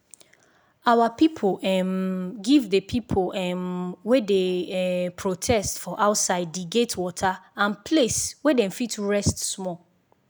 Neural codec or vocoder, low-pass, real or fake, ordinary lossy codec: none; none; real; none